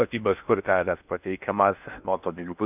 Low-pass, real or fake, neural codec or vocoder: 3.6 kHz; fake; codec, 16 kHz in and 24 kHz out, 0.6 kbps, FocalCodec, streaming, 4096 codes